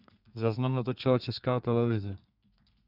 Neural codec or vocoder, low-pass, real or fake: codec, 32 kHz, 1.9 kbps, SNAC; 5.4 kHz; fake